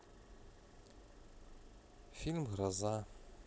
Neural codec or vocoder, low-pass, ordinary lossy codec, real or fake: none; none; none; real